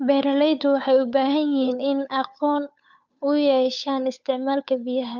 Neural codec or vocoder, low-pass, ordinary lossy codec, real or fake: codec, 16 kHz, 8 kbps, FunCodec, trained on Chinese and English, 25 frames a second; 7.2 kHz; none; fake